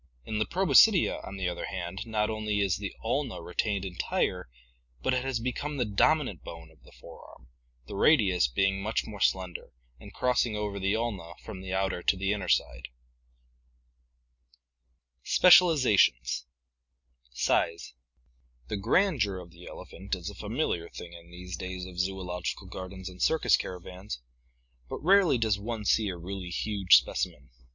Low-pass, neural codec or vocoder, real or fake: 7.2 kHz; none; real